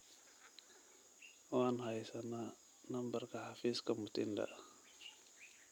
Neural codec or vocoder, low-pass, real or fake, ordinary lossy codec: none; 19.8 kHz; real; none